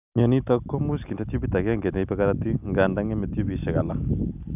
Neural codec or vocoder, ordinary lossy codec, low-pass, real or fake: none; none; 3.6 kHz; real